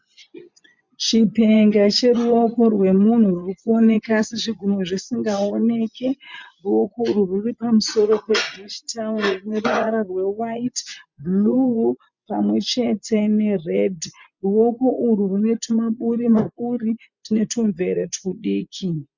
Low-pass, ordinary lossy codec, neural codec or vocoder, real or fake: 7.2 kHz; MP3, 64 kbps; vocoder, 24 kHz, 100 mel bands, Vocos; fake